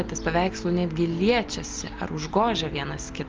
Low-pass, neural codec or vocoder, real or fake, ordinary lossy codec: 7.2 kHz; none; real; Opus, 24 kbps